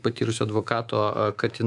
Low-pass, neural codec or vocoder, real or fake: 10.8 kHz; codec, 24 kHz, 3.1 kbps, DualCodec; fake